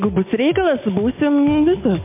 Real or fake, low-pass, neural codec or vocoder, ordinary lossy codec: real; 3.6 kHz; none; MP3, 32 kbps